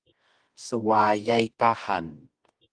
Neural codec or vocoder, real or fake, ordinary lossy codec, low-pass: codec, 24 kHz, 0.9 kbps, WavTokenizer, medium music audio release; fake; Opus, 24 kbps; 9.9 kHz